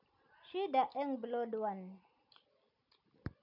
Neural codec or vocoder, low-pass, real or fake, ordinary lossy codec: none; 5.4 kHz; real; none